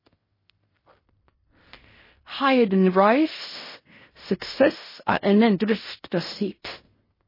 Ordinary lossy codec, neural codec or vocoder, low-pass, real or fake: MP3, 24 kbps; codec, 16 kHz in and 24 kHz out, 0.4 kbps, LongCat-Audio-Codec, fine tuned four codebook decoder; 5.4 kHz; fake